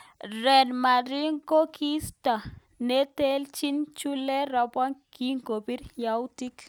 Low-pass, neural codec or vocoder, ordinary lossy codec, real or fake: none; none; none; real